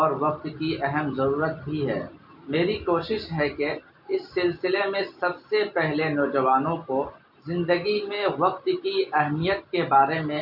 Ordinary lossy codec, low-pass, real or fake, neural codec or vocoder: MP3, 48 kbps; 5.4 kHz; real; none